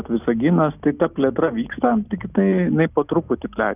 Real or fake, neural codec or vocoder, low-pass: real; none; 3.6 kHz